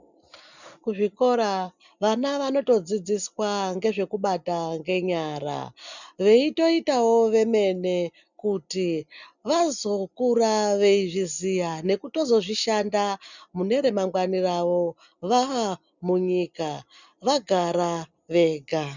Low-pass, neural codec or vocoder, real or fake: 7.2 kHz; none; real